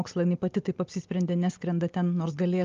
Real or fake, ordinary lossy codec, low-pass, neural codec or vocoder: real; Opus, 32 kbps; 7.2 kHz; none